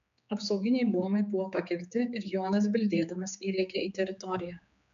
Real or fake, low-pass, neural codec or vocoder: fake; 7.2 kHz; codec, 16 kHz, 4 kbps, X-Codec, HuBERT features, trained on general audio